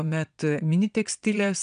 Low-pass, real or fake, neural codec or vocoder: 9.9 kHz; fake; vocoder, 22.05 kHz, 80 mel bands, Vocos